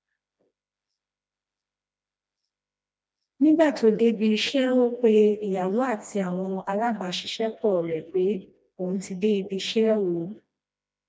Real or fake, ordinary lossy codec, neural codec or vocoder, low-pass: fake; none; codec, 16 kHz, 1 kbps, FreqCodec, smaller model; none